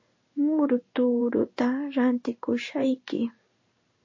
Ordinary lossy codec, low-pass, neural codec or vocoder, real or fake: MP3, 32 kbps; 7.2 kHz; codec, 16 kHz in and 24 kHz out, 1 kbps, XY-Tokenizer; fake